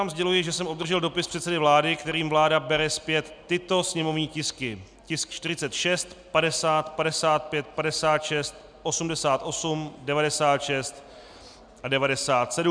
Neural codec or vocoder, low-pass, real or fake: none; 9.9 kHz; real